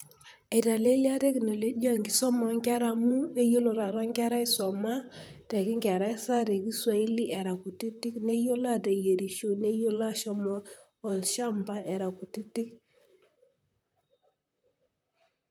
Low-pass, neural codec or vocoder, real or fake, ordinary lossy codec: none; vocoder, 44.1 kHz, 128 mel bands, Pupu-Vocoder; fake; none